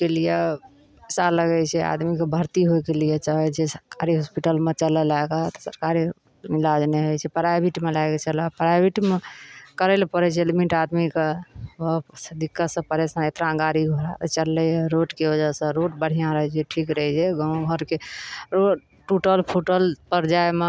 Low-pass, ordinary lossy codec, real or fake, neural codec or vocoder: none; none; real; none